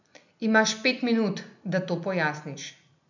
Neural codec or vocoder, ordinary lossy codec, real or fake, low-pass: none; none; real; 7.2 kHz